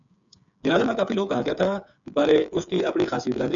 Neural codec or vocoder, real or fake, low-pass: codec, 16 kHz, 8 kbps, FreqCodec, smaller model; fake; 7.2 kHz